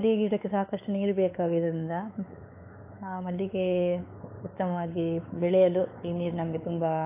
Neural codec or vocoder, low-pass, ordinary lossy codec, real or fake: codec, 16 kHz, 4 kbps, X-Codec, WavLM features, trained on Multilingual LibriSpeech; 3.6 kHz; AAC, 24 kbps; fake